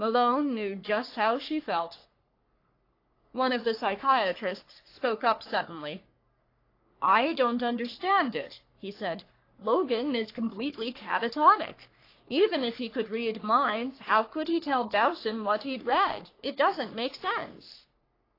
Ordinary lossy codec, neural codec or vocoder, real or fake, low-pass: AAC, 32 kbps; codec, 44.1 kHz, 3.4 kbps, Pupu-Codec; fake; 5.4 kHz